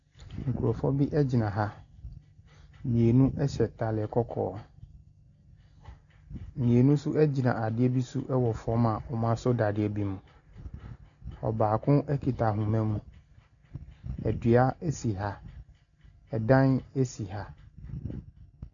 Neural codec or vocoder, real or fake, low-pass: none; real; 7.2 kHz